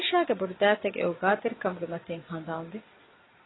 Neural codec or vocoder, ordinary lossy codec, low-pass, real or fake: none; AAC, 16 kbps; 7.2 kHz; real